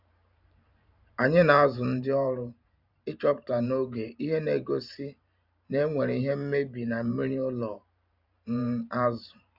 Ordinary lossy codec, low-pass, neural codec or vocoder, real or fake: none; 5.4 kHz; vocoder, 44.1 kHz, 128 mel bands every 512 samples, BigVGAN v2; fake